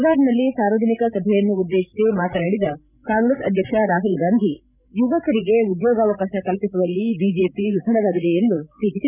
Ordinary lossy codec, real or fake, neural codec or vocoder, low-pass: none; fake; codec, 16 kHz, 16 kbps, FreqCodec, larger model; 3.6 kHz